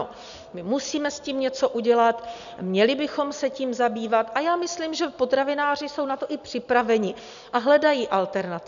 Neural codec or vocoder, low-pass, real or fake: none; 7.2 kHz; real